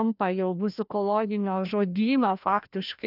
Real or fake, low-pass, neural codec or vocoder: fake; 5.4 kHz; codec, 16 kHz, 1 kbps, FreqCodec, larger model